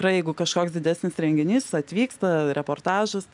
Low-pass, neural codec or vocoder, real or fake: 10.8 kHz; none; real